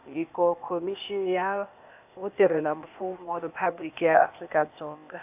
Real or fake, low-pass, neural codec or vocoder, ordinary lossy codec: fake; 3.6 kHz; codec, 16 kHz, 0.8 kbps, ZipCodec; AAC, 32 kbps